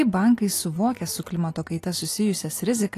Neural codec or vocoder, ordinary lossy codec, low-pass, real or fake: none; AAC, 48 kbps; 14.4 kHz; real